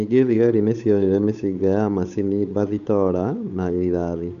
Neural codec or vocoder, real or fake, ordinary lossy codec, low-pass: codec, 16 kHz, 8 kbps, FunCodec, trained on Chinese and English, 25 frames a second; fake; none; 7.2 kHz